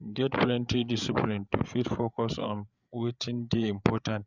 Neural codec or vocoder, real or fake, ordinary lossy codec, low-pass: codec, 16 kHz, 8 kbps, FreqCodec, larger model; fake; Opus, 64 kbps; 7.2 kHz